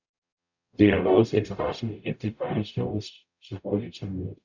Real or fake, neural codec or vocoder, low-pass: fake; codec, 44.1 kHz, 0.9 kbps, DAC; 7.2 kHz